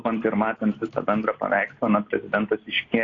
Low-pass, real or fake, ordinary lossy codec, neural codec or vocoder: 7.2 kHz; real; AAC, 32 kbps; none